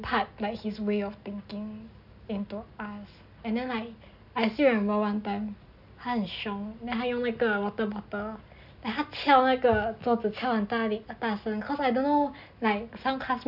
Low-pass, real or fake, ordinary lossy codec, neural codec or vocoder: 5.4 kHz; real; none; none